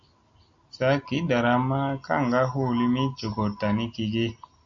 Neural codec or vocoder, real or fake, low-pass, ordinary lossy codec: none; real; 7.2 kHz; AAC, 64 kbps